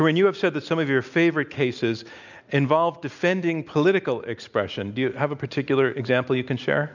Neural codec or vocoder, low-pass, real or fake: none; 7.2 kHz; real